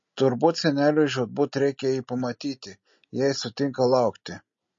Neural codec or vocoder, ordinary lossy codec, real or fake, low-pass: none; MP3, 32 kbps; real; 7.2 kHz